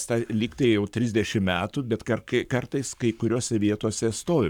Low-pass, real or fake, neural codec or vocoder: 19.8 kHz; fake; codec, 44.1 kHz, 7.8 kbps, Pupu-Codec